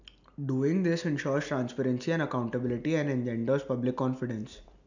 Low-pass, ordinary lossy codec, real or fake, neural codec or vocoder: 7.2 kHz; none; real; none